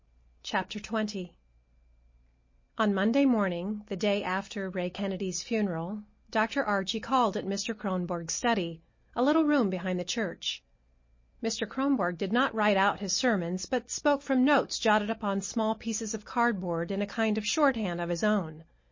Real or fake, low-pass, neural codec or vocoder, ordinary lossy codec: real; 7.2 kHz; none; MP3, 32 kbps